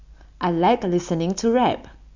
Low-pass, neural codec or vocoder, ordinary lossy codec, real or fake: 7.2 kHz; none; none; real